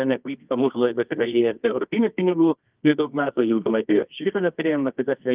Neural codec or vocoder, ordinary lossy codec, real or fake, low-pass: codec, 24 kHz, 0.9 kbps, WavTokenizer, medium music audio release; Opus, 24 kbps; fake; 3.6 kHz